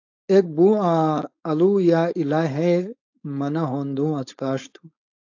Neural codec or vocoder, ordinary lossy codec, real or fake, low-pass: codec, 16 kHz, 4.8 kbps, FACodec; AAC, 48 kbps; fake; 7.2 kHz